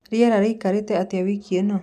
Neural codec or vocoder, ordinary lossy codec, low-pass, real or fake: none; none; 14.4 kHz; real